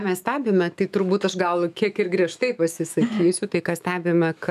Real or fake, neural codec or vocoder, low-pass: fake; autoencoder, 48 kHz, 128 numbers a frame, DAC-VAE, trained on Japanese speech; 14.4 kHz